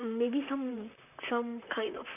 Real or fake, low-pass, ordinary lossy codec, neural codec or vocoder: fake; 3.6 kHz; none; vocoder, 44.1 kHz, 128 mel bands, Pupu-Vocoder